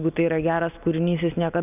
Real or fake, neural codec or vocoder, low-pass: real; none; 3.6 kHz